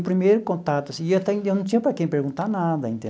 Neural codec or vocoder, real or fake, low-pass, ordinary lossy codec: none; real; none; none